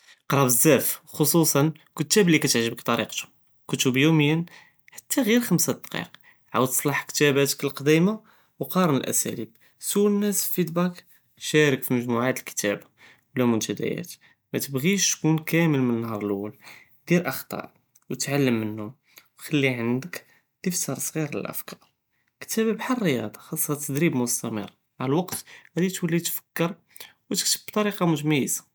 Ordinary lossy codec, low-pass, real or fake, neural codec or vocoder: none; none; real; none